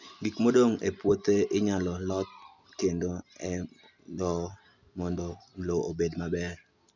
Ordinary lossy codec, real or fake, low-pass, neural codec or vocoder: none; real; 7.2 kHz; none